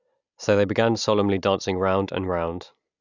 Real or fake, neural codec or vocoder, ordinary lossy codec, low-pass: real; none; none; 7.2 kHz